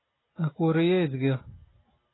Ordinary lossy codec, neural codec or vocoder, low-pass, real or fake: AAC, 16 kbps; none; 7.2 kHz; real